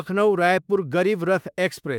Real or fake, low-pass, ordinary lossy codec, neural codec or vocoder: fake; 19.8 kHz; none; autoencoder, 48 kHz, 32 numbers a frame, DAC-VAE, trained on Japanese speech